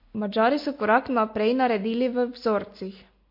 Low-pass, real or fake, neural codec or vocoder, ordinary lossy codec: 5.4 kHz; real; none; MP3, 32 kbps